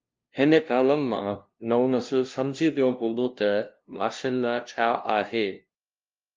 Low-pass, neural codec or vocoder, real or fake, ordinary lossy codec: 7.2 kHz; codec, 16 kHz, 0.5 kbps, FunCodec, trained on LibriTTS, 25 frames a second; fake; Opus, 24 kbps